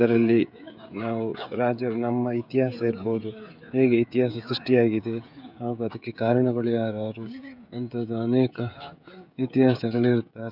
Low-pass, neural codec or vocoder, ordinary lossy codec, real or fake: 5.4 kHz; codec, 16 kHz, 16 kbps, FreqCodec, smaller model; none; fake